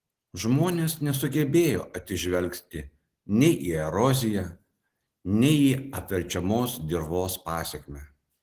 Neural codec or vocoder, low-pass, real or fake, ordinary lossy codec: none; 14.4 kHz; real; Opus, 16 kbps